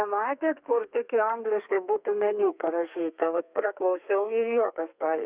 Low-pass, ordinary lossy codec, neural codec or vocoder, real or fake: 3.6 kHz; Opus, 64 kbps; codec, 32 kHz, 1.9 kbps, SNAC; fake